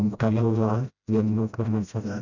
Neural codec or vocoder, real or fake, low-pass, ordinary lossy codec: codec, 16 kHz, 0.5 kbps, FreqCodec, smaller model; fake; 7.2 kHz; none